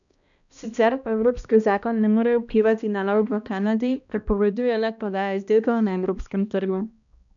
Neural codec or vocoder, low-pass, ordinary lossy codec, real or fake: codec, 16 kHz, 1 kbps, X-Codec, HuBERT features, trained on balanced general audio; 7.2 kHz; none; fake